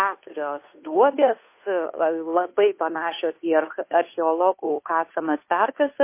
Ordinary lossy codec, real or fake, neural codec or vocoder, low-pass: MP3, 24 kbps; fake; codec, 16 kHz, 2 kbps, FunCodec, trained on Chinese and English, 25 frames a second; 3.6 kHz